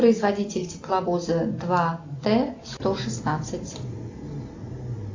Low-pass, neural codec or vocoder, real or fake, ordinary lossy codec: 7.2 kHz; none; real; AAC, 48 kbps